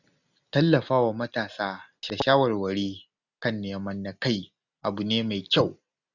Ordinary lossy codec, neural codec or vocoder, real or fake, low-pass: none; none; real; 7.2 kHz